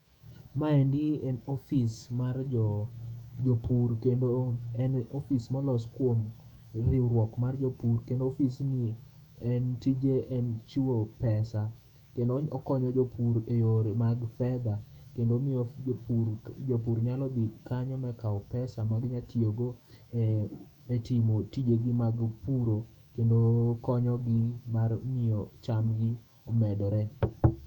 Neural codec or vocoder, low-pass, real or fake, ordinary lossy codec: autoencoder, 48 kHz, 128 numbers a frame, DAC-VAE, trained on Japanese speech; 19.8 kHz; fake; none